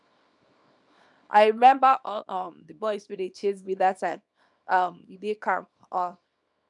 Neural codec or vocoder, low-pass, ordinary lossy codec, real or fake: codec, 24 kHz, 0.9 kbps, WavTokenizer, small release; 10.8 kHz; none; fake